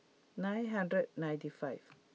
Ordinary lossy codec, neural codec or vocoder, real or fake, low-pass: none; none; real; none